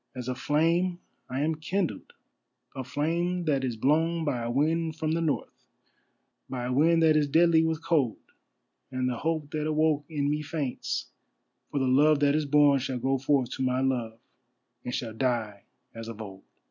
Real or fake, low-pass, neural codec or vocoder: real; 7.2 kHz; none